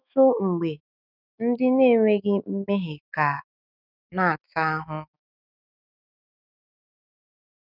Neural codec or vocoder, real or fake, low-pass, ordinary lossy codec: autoencoder, 48 kHz, 128 numbers a frame, DAC-VAE, trained on Japanese speech; fake; 5.4 kHz; none